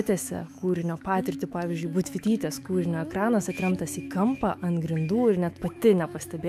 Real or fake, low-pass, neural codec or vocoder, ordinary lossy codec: fake; 14.4 kHz; autoencoder, 48 kHz, 128 numbers a frame, DAC-VAE, trained on Japanese speech; AAC, 96 kbps